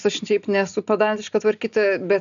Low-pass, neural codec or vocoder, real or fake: 7.2 kHz; none; real